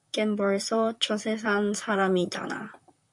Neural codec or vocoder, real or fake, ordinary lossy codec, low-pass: vocoder, 44.1 kHz, 128 mel bands, Pupu-Vocoder; fake; MP3, 64 kbps; 10.8 kHz